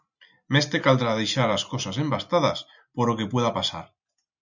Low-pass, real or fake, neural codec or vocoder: 7.2 kHz; real; none